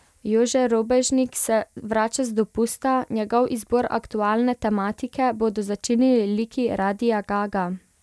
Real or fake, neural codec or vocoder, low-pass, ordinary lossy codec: real; none; none; none